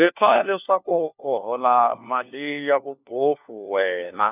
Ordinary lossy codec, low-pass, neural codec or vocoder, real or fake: none; 3.6 kHz; codec, 16 kHz, 1 kbps, FunCodec, trained on LibriTTS, 50 frames a second; fake